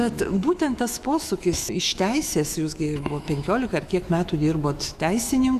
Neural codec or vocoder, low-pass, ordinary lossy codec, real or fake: autoencoder, 48 kHz, 128 numbers a frame, DAC-VAE, trained on Japanese speech; 14.4 kHz; Opus, 64 kbps; fake